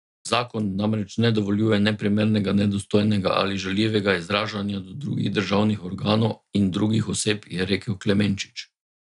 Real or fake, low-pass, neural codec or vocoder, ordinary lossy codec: real; 10.8 kHz; none; none